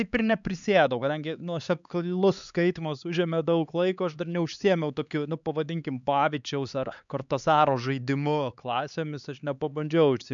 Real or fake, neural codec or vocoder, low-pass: fake; codec, 16 kHz, 4 kbps, X-Codec, HuBERT features, trained on LibriSpeech; 7.2 kHz